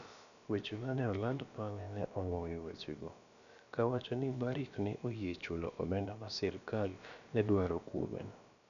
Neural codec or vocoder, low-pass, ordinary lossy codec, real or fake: codec, 16 kHz, about 1 kbps, DyCAST, with the encoder's durations; 7.2 kHz; none; fake